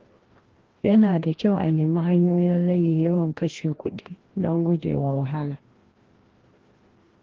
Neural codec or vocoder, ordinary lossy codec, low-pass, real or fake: codec, 16 kHz, 1 kbps, FreqCodec, larger model; Opus, 16 kbps; 7.2 kHz; fake